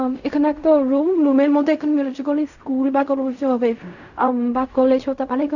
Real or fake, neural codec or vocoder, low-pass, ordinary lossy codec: fake; codec, 16 kHz in and 24 kHz out, 0.4 kbps, LongCat-Audio-Codec, fine tuned four codebook decoder; 7.2 kHz; none